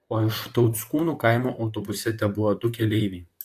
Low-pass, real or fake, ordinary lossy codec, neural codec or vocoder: 14.4 kHz; fake; AAC, 64 kbps; vocoder, 44.1 kHz, 128 mel bands, Pupu-Vocoder